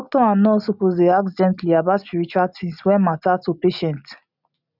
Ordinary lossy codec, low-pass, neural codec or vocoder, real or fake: none; 5.4 kHz; none; real